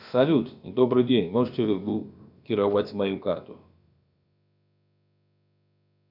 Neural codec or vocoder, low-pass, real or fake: codec, 16 kHz, about 1 kbps, DyCAST, with the encoder's durations; 5.4 kHz; fake